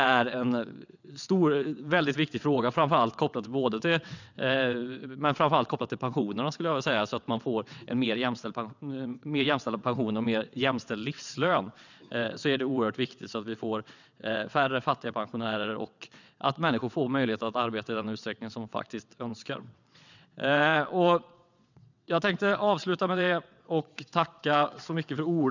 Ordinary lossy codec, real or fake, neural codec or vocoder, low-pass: none; fake; vocoder, 22.05 kHz, 80 mel bands, WaveNeXt; 7.2 kHz